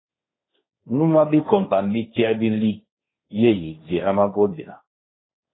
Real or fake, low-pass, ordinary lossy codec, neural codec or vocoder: fake; 7.2 kHz; AAC, 16 kbps; codec, 16 kHz, 1.1 kbps, Voila-Tokenizer